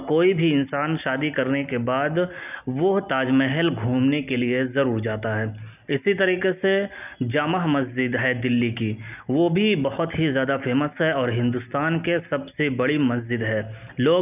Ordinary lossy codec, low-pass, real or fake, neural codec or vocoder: none; 3.6 kHz; real; none